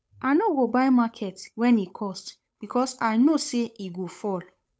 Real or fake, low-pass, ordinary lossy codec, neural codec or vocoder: fake; none; none; codec, 16 kHz, 8 kbps, FunCodec, trained on Chinese and English, 25 frames a second